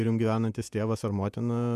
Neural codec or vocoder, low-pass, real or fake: vocoder, 48 kHz, 128 mel bands, Vocos; 14.4 kHz; fake